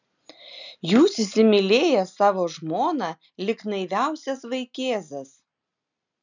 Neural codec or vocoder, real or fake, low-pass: none; real; 7.2 kHz